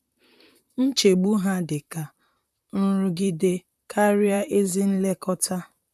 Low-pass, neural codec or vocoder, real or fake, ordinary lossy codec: 14.4 kHz; vocoder, 44.1 kHz, 128 mel bands, Pupu-Vocoder; fake; none